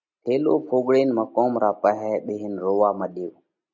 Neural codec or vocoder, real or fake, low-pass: none; real; 7.2 kHz